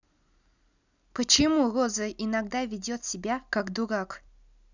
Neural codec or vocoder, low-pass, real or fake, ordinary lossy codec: none; 7.2 kHz; real; none